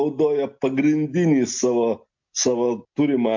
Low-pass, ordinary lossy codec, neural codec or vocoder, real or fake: 7.2 kHz; MP3, 48 kbps; none; real